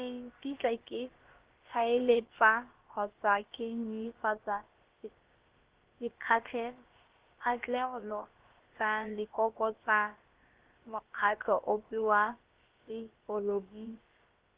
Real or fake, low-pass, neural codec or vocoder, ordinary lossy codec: fake; 3.6 kHz; codec, 16 kHz, about 1 kbps, DyCAST, with the encoder's durations; Opus, 16 kbps